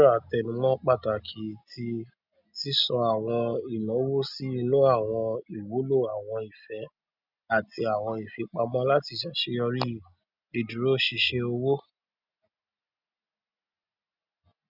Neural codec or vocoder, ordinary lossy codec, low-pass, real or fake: none; none; 5.4 kHz; real